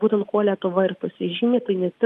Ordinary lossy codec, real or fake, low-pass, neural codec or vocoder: Opus, 32 kbps; real; 14.4 kHz; none